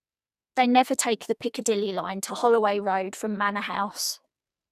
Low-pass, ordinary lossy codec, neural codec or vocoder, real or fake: 14.4 kHz; none; codec, 44.1 kHz, 2.6 kbps, SNAC; fake